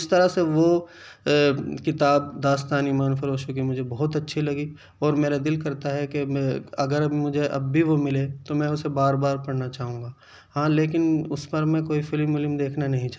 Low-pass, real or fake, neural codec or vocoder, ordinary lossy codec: none; real; none; none